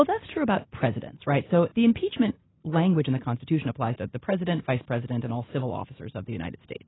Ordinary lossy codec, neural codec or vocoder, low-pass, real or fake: AAC, 16 kbps; none; 7.2 kHz; real